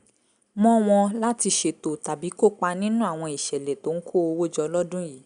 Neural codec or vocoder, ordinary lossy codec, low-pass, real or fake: none; none; 9.9 kHz; real